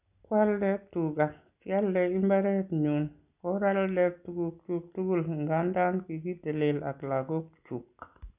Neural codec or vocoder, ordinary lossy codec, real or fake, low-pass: none; none; real; 3.6 kHz